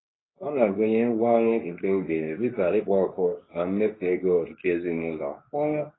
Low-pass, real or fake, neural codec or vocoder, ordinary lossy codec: 7.2 kHz; fake; codec, 24 kHz, 0.9 kbps, WavTokenizer, medium speech release version 2; AAC, 16 kbps